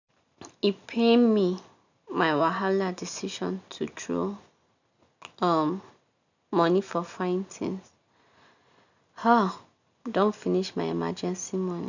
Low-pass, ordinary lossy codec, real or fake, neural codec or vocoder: 7.2 kHz; none; real; none